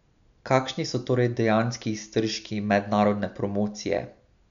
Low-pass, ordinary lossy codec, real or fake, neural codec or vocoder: 7.2 kHz; none; real; none